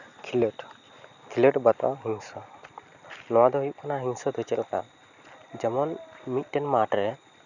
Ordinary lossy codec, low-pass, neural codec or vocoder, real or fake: none; 7.2 kHz; none; real